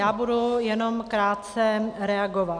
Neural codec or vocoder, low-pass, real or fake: none; 9.9 kHz; real